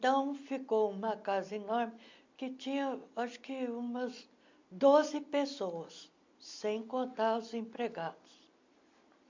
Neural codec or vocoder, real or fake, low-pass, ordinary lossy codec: none; real; 7.2 kHz; MP3, 48 kbps